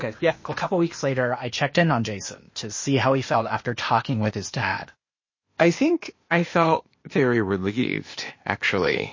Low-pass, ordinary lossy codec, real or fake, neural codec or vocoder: 7.2 kHz; MP3, 32 kbps; fake; codec, 16 kHz, 0.8 kbps, ZipCodec